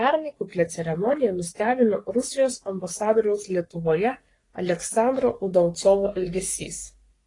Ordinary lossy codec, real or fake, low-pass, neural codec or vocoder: AAC, 32 kbps; fake; 10.8 kHz; codec, 44.1 kHz, 3.4 kbps, Pupu-Codec